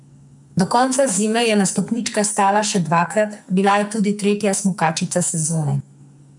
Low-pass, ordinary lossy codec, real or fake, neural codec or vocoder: 10.8 kHz; none; fake; codec, 44.1 kHz, 2.6 kbps, SNAC